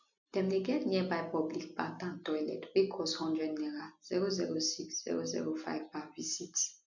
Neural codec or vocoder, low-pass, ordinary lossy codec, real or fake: none; 7.2 kHz; none; real